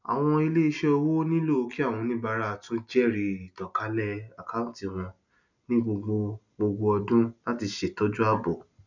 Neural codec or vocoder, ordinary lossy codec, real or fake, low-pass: none; none; real; 7.2 kHz